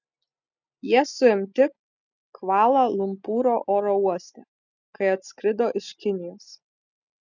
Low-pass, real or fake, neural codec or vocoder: 7.2 kHz; real; none